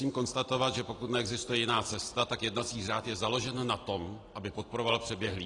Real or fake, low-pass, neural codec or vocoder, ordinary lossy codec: real; 10.8 kHz; none; AAC, 32 kbps